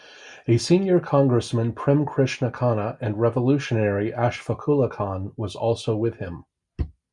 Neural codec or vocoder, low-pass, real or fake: none; 10.8 kHz; real